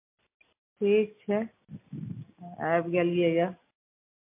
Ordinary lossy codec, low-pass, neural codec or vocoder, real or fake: MP3, 24 kbps; 3.6 kHz; none; real